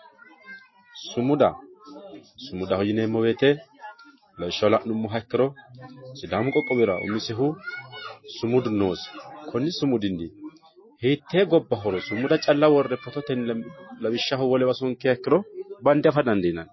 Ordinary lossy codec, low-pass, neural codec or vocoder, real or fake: MP3, 24 kbps; 7.2 kHz; none; real